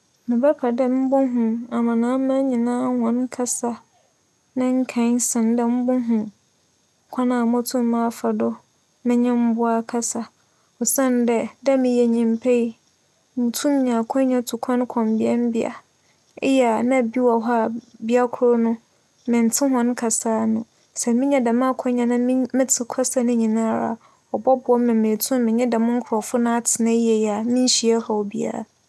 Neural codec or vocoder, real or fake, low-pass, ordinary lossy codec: none; real; none; none